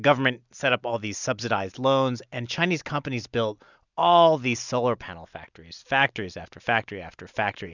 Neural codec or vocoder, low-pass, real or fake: none; 7.2 kHz; real